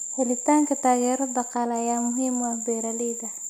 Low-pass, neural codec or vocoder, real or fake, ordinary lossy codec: 19.8 kHz; none; real; none